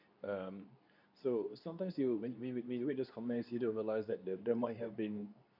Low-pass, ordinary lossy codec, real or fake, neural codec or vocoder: 5.4 kHz; none; fake; codec, 24 kHz, 0.9 kbps, WavTokenizer, medium speech release version 2